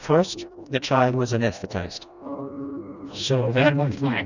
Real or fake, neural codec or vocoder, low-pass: fake; codec, 16 kHz, 1 kbps, FreqCodec, smaller model; 7.2 kHz